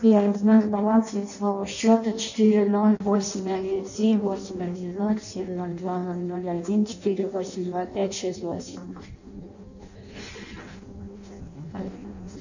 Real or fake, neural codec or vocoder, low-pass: fake; codec, 16 kHz in and 24 kHz out, 0.6 kbps, FireRedTTS-2 codec; 7.2 kHz